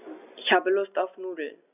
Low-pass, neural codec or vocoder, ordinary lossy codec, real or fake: 3.6 kHz; none; none; real